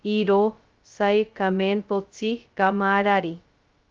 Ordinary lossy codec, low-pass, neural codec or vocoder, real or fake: Opus, 32 kbps; 7.2 kHz; codec, 16 kHz, 0.2 kbps, FocalCodec; fake